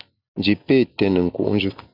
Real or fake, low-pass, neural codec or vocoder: real; 5.4 kHz; none